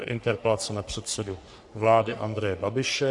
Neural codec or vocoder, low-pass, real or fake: codec, 44.1 kHz, 3.4 kbps, Pupu-Codec; 10.8 kHz; fake